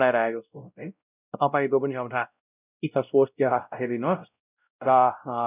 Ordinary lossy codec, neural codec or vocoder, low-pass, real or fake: none; codec, 16 kHz, 0.5 kbps, X-Codec, WavLM features, trained on Multilingual LibriSpeech; 3.6 kHz; fake